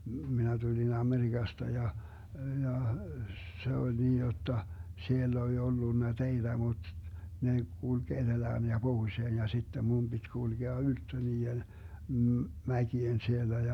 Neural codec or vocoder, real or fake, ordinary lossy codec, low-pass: none; real; none; 19.8 kHz